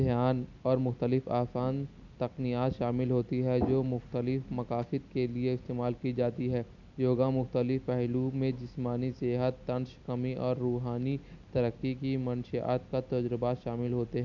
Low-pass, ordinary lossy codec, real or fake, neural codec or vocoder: 7.2 kHz; none; real; none